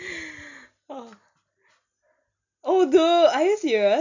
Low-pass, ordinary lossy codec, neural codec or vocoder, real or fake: 7.2 kHz; none; none; real